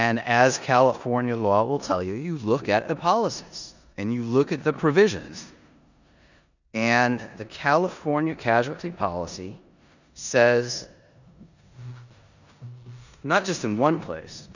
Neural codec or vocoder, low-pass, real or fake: codec, 16 kHz in and 24 kHz out, 0.9 kbps, LongCat-Audio-Codec, four codebook decoder; 7.2 kHz; fake